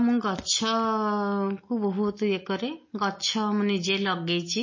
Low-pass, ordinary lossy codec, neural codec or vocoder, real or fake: 7.2 kHz; MP3, 32 kbps; none; real